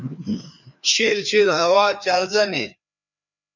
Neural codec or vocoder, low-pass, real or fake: codec, 16 kHz, 2 kbps, FreqCodec, larger model; 7.2 kHz; fake